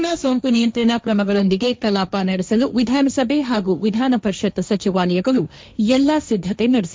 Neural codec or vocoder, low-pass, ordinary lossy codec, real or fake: codec, 16 kHz, 1.1 kbps, Voila-Tokenizer; none; none; fake